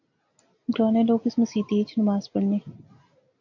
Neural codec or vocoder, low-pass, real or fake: none; 7.2 kHz; real